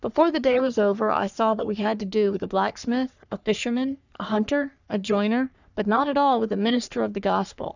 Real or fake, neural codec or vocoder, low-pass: fake; codec, 44.1 kHz, 3.4 kbps, Pupu-Codec; 7.2 kHz